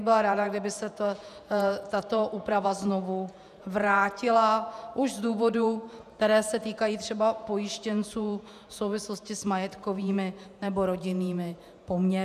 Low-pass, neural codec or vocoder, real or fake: 14.4 kHz; vocoder, 48 kHz, 128 mel bands, Vocos; fake